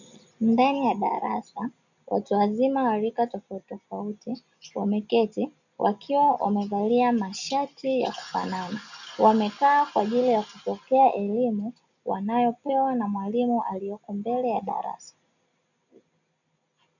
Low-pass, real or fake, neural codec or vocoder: 7.2 kHz; real; none